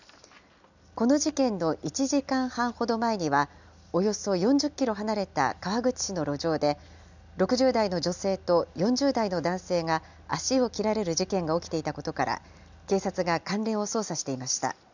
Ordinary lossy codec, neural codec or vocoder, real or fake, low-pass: none; none; real; 7.2 kHz